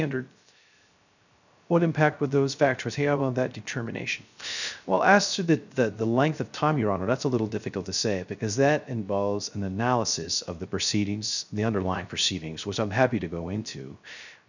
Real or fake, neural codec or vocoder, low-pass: fake; codec, 16 kHz, 0.3 kbps, FocalCodec; 7.2 kHz